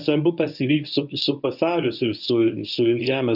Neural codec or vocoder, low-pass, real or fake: codec, 24 kHz, 0.9 kbps, WavTokenizer, medium speech release version 1; 5.4 kHz; fake